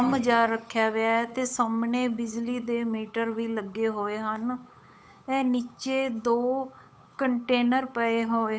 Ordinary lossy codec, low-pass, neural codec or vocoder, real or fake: none; none; codec, 16 kHz, 8 kbps, FunCodec, trained on Chinese and English, 25 frames a second; fake